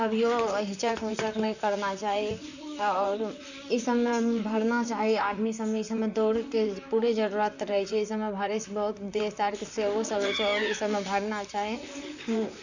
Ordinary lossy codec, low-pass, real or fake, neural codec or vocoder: none; 7.2 kHz; fake; vocoder, 44.1 kHz, 128 mel bands, Pupu-Vocoder